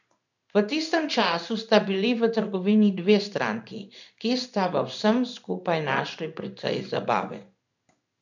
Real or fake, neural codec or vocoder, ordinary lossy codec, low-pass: fake; codec, 16 kHz in and 24 kHz out, 1 kbps, XY-Tokenizer; none; 7.2 kHz